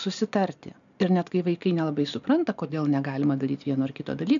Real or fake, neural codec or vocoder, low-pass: real; none; 7.2 kHz